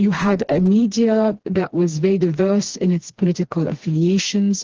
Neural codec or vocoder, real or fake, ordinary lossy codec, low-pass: codec, 16 kHz, 2 kbps, FreqCodec, smaller model; fake; Opus, 16 kbps; 7.2 kHz